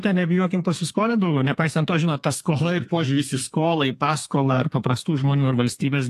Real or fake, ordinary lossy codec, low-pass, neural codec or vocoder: fake; AAC, 64 kbps; 14.4 kHz; codec, 32 kHz, 1.9 kbps, SNAC